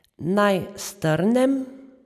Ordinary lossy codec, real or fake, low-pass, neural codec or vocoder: none; real; 14.4 kHz; none